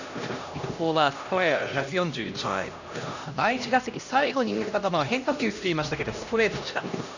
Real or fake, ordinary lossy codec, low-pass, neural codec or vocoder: fake; none; 7.2 kHz; codec, 16 kHz, 1 kbps, X-Codec, HuBERT features, trained on LibriSpeech